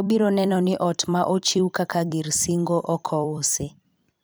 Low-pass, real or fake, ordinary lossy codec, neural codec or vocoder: none; fake; none; vocoder, 44.1 kHz, 128 mel bands every 256 samples, BigVGAN v2